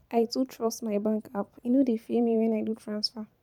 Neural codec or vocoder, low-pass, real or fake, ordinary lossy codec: vocoder, 44.1 kHz, 128 mel bands every 512 samples, BigVGAN v2; 19.8 kHz; fake; none